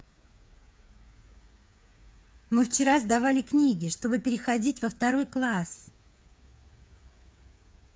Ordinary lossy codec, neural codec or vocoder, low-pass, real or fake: none; codec, 16 kHz, 8 kbps, FreqCodec, smaller model; none; fake